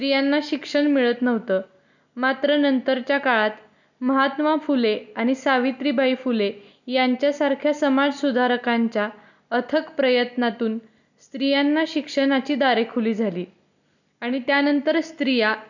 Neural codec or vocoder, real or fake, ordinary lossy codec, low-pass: none; real; none; 7.2 kHz